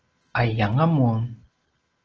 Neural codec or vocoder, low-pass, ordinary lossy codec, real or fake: none; 7.2 kHz; Opus, 16 kbps; real